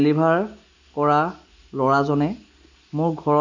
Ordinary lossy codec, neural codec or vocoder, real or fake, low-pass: MP3, 48 kbps; none; real; 7.2 kHz